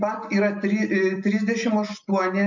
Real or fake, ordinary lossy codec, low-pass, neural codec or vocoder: real; MP3, 48 kbps; 7.2 kHz; none